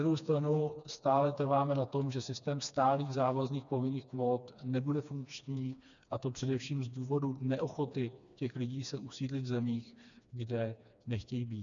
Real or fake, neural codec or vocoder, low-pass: fake; codec, 16 kHz, 2 kbps, FreqCodec, smaller model; 7.2 kHz